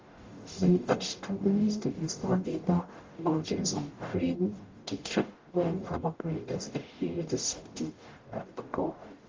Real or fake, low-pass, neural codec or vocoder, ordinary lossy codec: fake; 7.2 kHz; codec, 44.1 kHz, 0.9 kbps, DAC; Opus, 32 kbps